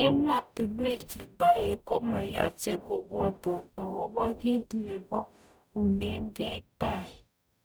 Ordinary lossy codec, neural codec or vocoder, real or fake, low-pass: none; codec, 44.1 kHz, 0.9 kbps, DAC; fake; none